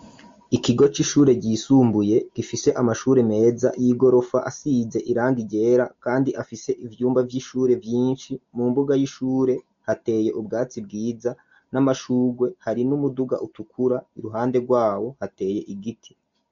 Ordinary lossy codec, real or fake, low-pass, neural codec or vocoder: MP3, 48 kbps; real; 7.2 kHz; none